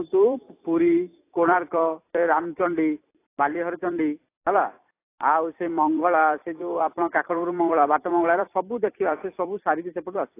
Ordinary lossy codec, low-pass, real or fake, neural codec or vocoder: AAC, 24 kbps; 3.6 kHz; fake; vocoder, 44.1 kHz, 128 mel bands every 256 samples, BigVGAN v2